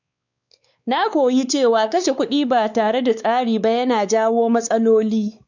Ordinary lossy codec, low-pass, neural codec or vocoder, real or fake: none; 7.2 kHz; codec, 16 kHz, 4 kbps, X-Codec, WavLM features, trained on Multilingual LibriSpeech; fake